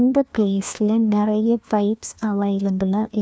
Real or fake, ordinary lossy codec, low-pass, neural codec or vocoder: fake; none; none; codec, 16 kHz, 1 kbps, FunCodec, trained on LibriTTS, 50 frames a second